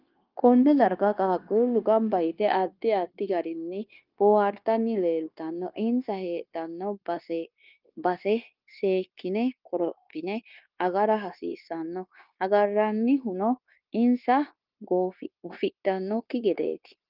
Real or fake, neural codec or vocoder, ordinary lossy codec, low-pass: fake; codec, 16 kHz, 0.9 kbps, LongCat-Audio-Codec; Opus, 24 kbps; 5.4 kHz